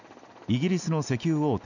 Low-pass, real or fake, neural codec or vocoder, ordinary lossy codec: 7.2 kHz; real; none; none